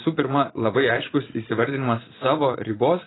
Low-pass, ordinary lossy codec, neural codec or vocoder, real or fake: 7.2 kHz; AAC, 16 kbps; vocoder, 44.1 kHz, 80 mel bands, Vocos; fake